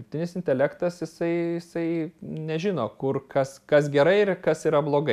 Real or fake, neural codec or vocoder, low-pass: real; none; 14.4 kHz